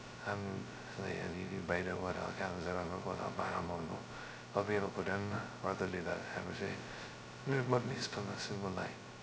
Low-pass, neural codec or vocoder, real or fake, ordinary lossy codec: none; codec, 16 kHz, 0.2 kbps, FocalCodec; fake; none